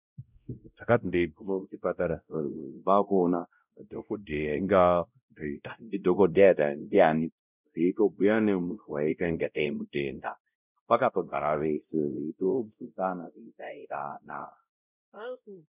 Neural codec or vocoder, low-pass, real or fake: codec, 16 kHz, 0.5 kbps, X-Codec, WavLM features, trained on Multilingual LibriSpeech; 3.6 kHz; fake